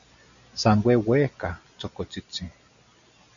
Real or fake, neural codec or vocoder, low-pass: real; none; 7.2 kHz